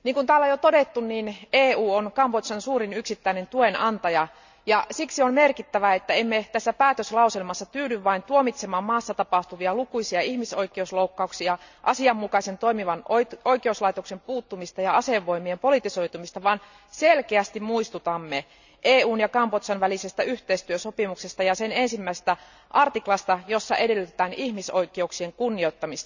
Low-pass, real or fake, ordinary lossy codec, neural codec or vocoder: 7.2 kHz; real; none; none